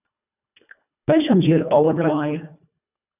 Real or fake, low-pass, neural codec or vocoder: fake; 3.6 kHz; codec, 24 kHz, 1.5 kbps, HILCodec